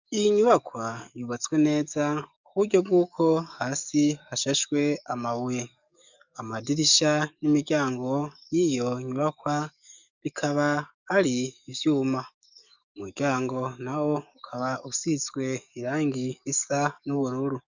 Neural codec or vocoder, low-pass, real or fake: codec, 44.1 kHz, 7.8 kbps, DAC; 7.2 kHz; fake